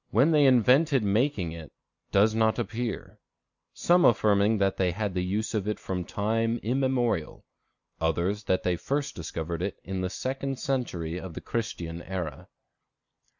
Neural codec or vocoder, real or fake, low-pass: none; real; 7.2 kHz